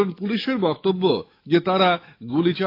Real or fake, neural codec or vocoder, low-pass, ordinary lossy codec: fake; autoencoder, 48 kHz, 128 numbers a frame, DAC-VAE, trained on Japanese speech; 5.4 kHz; AAC, 32 kbps